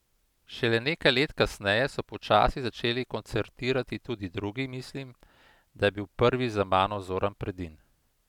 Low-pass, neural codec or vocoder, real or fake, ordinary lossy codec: 19.8 kHz; none; real; none